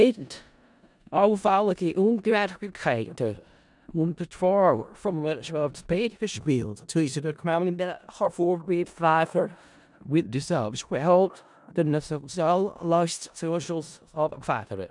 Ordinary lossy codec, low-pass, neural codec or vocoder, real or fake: none; 10.8 kHz; codec, 16 kHz in and 24 kHz out, 0.4 kbps, LongCat-Audio-Codec, four codebook decoder; fake